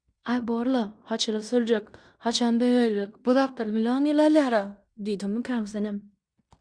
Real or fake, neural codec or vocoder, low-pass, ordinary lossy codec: fake; codec, 16 kHz in and 24 kHz out, 0.9 kbps, LongCat-Audio-Codec, fine tuned four codebook decoder; 9.9 kHz; Opus, 64 kbps